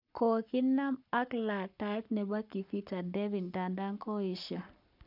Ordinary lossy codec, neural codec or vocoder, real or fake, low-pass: none; codec, 44.1 kHz, 7.8 kbps, Pupu-Codec; fake; 5.4 kHz